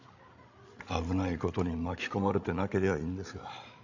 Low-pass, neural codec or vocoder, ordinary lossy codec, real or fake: 7.2 kHz; codec, 16 kHz, 16 kbps, FreqCodec, larger model; none; fake